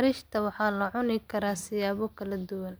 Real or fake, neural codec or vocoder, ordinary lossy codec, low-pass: real; none; none; none